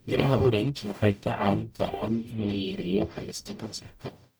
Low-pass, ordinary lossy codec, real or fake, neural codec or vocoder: none; none; fake; codec, 44.1 kHz, 0.9 kbps, DAC